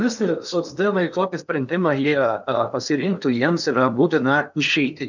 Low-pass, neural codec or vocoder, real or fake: 7.2 kHz; codec, 16 kHz in and 24 kHz out, 0.8 kbps, FocalCodec, streaming, 65536 codes; fake